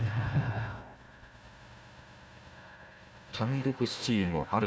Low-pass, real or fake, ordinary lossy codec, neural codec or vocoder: none; fake; none; codec, 16 kHz, 1 kbps, FunCodec, trained on Chinese and English, 50 frames a second